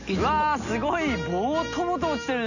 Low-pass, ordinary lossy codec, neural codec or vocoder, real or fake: 7.2 kHz; none; none; real